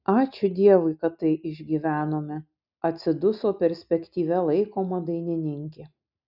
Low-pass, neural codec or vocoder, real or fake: 5.4 kHz; none; real